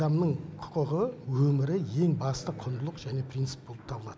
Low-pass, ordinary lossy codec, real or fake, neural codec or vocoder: none; none; real; none